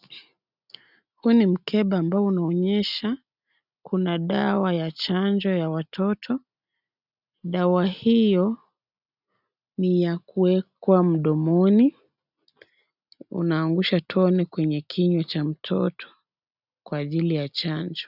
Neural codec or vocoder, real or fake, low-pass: none; real; 5.4 kHz